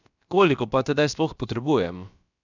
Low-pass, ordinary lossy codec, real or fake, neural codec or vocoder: 7.2 kHz; none; fake; codec, 16 kHz, about 1 kbps, DyCAST, with the encoder's durations